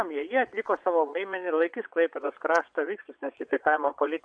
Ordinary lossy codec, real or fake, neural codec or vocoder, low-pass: MP3, 64 kbps; fake; vocoder, 22.05 kHz, 80 mel bands, Vocos; 9.9 kHz